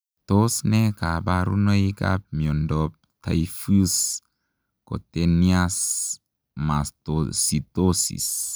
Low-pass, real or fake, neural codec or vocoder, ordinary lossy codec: none; real; none; none